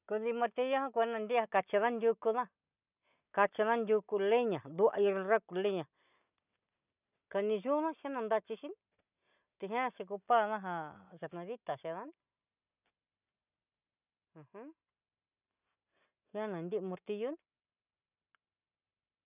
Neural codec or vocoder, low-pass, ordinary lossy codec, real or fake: none; 3.6 kHz; none; real